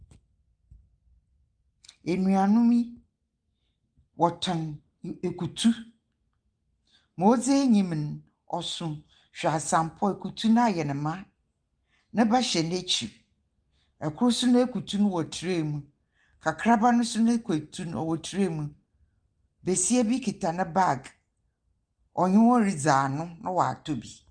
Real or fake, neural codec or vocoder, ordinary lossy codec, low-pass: real; none; Opus, 32 kbps; 9.9 kHz